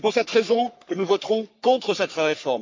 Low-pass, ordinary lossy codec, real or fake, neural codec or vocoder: 7.2 kHz; MP3, 64 kbps; fake; codec, 44.1 kHz, 3.4 kbps, Pupu-Codec